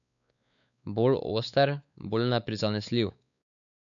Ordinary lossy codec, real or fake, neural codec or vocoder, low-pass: none; fake; codec, 16 kHz, 4 kbps, X-Codec, WavLM features, trained on Multilingual LibriSpeech; 7.2 kHz